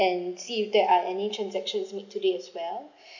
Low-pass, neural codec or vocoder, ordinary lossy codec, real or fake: 7.2 kHz; none; none; real